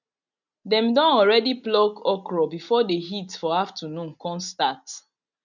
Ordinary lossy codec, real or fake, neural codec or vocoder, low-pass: none; real; none; 7.2 kHz